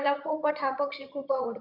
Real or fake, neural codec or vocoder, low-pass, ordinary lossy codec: fake; vocoder, 22.05 kHz, 80 mel bands, HiFi-GAN; 5.4 kHz; none